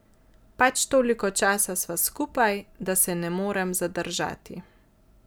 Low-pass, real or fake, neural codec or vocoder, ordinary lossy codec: none; real; none; none